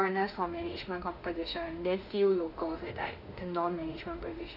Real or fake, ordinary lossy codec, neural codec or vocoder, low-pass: fake; none; autoencoder, 48 kHz, 32 numbers a frame, DAC-VAE, trained on Japanese speech; 5.4 kHz